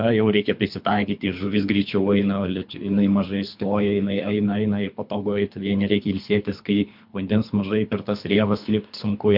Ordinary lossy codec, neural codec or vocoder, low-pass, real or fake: MP3, 48 kbps; codec, 24 kHz, 3 kbps, HILCodec; 5.4 kHz; fake